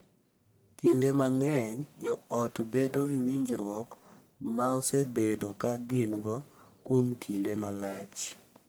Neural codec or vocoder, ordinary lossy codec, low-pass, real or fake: codec, 44.1 kHz, 1.7 kbps, Pupu-Codec; none; none; fake